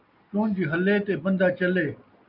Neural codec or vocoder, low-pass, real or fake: none; 5.4 kHz; real